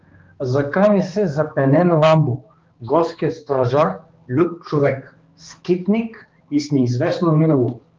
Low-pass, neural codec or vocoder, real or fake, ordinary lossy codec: 7.2 kHz; codec, 16 kHz, 2 kbps, X-Codec, HuBERT features, trained on balanced general audio; fake; Opus, 24 kbps